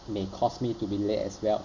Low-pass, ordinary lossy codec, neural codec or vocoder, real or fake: 7.2 kHz; none; none; real